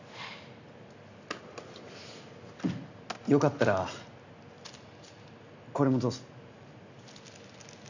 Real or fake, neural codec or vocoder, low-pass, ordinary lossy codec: real; none; 7.2 kHz; none